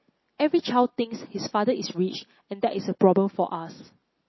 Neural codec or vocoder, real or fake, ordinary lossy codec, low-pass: none; real; MP3, 24 kbps; 7.2 kHz